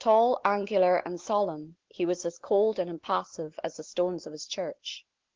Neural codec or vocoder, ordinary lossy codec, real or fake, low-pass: none; Opus, 16 kbps; real; 7.2 kHz